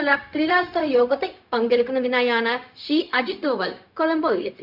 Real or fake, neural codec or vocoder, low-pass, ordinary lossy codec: fake; codec, 16 kHz, 0.4 kbps, LongCat-Audio-Codec; 5.4 kHz; none